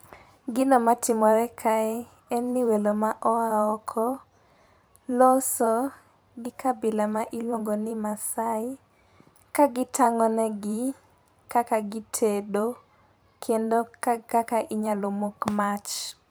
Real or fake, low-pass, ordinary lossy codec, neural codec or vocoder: fake; none; none; vocoder, 44.1 kHz, 128 mel bands every 512 samples, BigVGAN v2